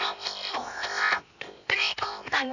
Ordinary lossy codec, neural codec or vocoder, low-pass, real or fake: none; codec, 16 kHz, 0.7 kbps, FocalCodec; 7.2 kHz; fake